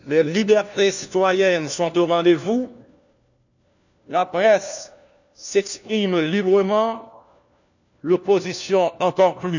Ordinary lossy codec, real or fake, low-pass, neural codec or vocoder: none; fake; 7.2 kHz; codec, 16 kHz, 1 kbps, FunCodec, trained on LibriTTS, 50 frames a second